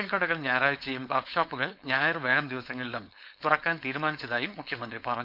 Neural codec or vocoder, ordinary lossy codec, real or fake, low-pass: codec, 16 kHz, 4.8 kbps, FACodec; none; fake; 5.4 kHz